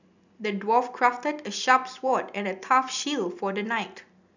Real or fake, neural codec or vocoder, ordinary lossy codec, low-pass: real; none; none; 7.2 kHz